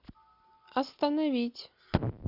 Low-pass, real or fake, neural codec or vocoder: 5.4 kHz; real; none